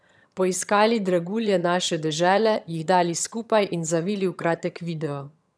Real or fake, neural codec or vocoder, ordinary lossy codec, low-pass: fake; vocoder, 22.05 kHz, 80 mel bands, HiFi-GAN; none; none